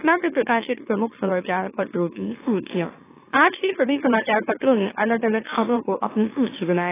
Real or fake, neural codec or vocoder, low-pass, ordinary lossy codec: fake; autoencoder, 44.1 kHz, a latent of 192 numbers a frame, MeloTTS; 3.6 kHz; AAC, 16 kbps